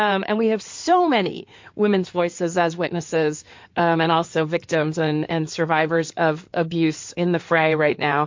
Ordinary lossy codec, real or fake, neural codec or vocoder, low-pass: MP3, 48 kbps; fake; codec, 16 kHz in and 24 kHz out, 2.2 kbps, FireRedTTS-2 codec; 7.2 kHz